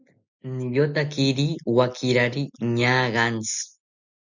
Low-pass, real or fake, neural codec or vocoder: 7.2 kHz; real; none